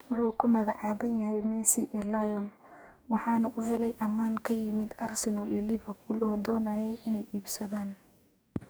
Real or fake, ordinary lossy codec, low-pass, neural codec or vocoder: fake; none; none; codec, 44.1 kHz, 2.6 kbps, DAC